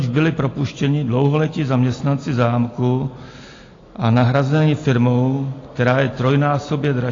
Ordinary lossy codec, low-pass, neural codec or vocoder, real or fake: AAC, 32 kbps; 7.2 kHz; none; real